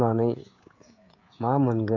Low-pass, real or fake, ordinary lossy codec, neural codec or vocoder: 7.2 kHz; fake; AAC, 48 kbps; codec, 16 kHz, 16 kbps, FreqCodec, smaller model